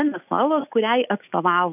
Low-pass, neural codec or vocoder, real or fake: 3.6 kHz; none; real